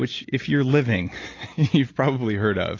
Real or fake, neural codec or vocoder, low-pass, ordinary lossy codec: real; none; 7.2 kHz; AAC, 32 kbps